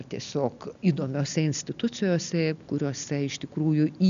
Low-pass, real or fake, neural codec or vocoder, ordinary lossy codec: 7.2 kHz; real; none; MP3, 96 kbps